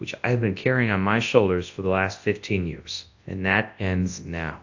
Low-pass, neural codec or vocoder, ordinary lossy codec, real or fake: 7.2 kHz; codec, 24 kHz, 0.9 kbps, WavTokenizer, large speech release; AAC, 48 kbps; fake